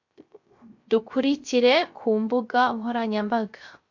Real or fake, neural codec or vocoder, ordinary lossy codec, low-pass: fake; codec, 16 kHz, 0.3 kbps, FocalCodec; MP3, 48 kbps; 7.2 kHz